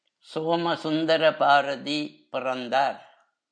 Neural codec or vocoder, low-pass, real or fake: none; 9.9 kHz; real